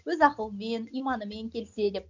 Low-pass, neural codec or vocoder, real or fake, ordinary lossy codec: 7.2 kHz; none; real; none